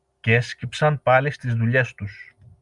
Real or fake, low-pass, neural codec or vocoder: real; 10.8 kHz; none